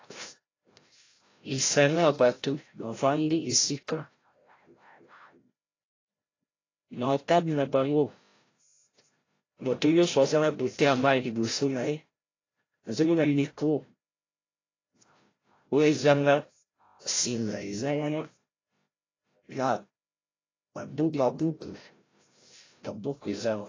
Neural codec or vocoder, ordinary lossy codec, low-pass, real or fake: codec, 16 kHz, 0.5 kbps, FreqCodec, larger model; AAC, 32 kbps; 7.2 kHz; fake